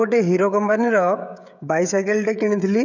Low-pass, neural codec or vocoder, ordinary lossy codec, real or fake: 7.2 kHz; codec, 16 kHz, 16 kbps, FreqCodec, smaller model; none; fake